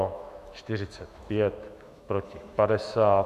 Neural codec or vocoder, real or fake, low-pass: autoencoder, 48 kHz, 128 numbers a frame, DAC-VAE, trained on Japanese speech; fake; 14.4 kHz